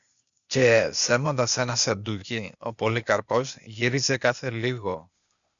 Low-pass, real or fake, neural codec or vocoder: 7.2 kHz; fake; codec, 16 kHz, 0.8 kbps, ZipCodec